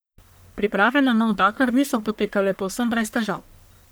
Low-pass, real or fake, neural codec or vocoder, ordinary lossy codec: none; fake; codec, 44.1 kHz, 1.7 kbps, Pupu-Codec; none